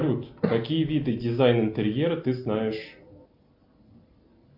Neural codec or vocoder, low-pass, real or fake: none; 5.4 kHz; real